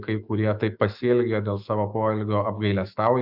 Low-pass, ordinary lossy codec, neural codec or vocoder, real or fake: 5.4 kHz; AAC, 48 kbps; autoencoder, 48 kHz, 128 numbers a frame, DAC-VAE, trained on Japanese speech; fake